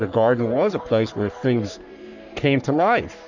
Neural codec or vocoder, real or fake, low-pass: codec, 44.1 kHz, 3.4 kbps, Pupu-Codec; fake; 7.2 kHz